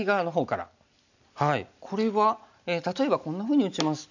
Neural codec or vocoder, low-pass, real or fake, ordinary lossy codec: none; 7.2 kHz; real; none